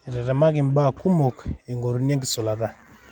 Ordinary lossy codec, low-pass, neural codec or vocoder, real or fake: Opus, 32 kbps; 19.8 kHz; none; real